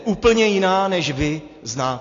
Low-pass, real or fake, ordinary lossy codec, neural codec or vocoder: 7.2 kHz; real; AAC, 32 kbps; none